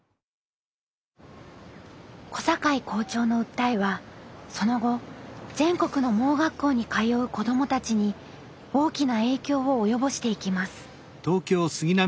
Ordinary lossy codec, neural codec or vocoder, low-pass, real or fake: none; none; none; real